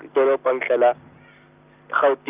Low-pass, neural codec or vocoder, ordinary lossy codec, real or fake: 3.6 kHz; none; Opus, 24 kbps; real